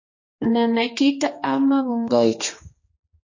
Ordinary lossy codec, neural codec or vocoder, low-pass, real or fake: MP3, 32 kbps; codec, 16 kHz, 2 kbps, X-Codec, HuBERT features, trained on general audio; 7.2 kHz; fake